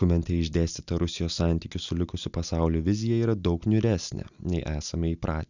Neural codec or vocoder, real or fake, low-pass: none; real; 7.2 kHz